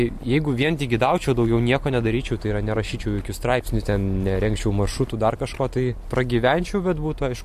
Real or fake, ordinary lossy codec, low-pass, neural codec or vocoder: real; MP3, 64 kbps; 14.4 kHz; none